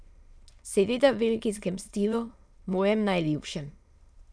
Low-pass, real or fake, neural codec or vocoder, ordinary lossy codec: none; fake; autoencoder, 22.05 kHz, a latent of 192 numbers a frame, VITS, trained on many speakers; none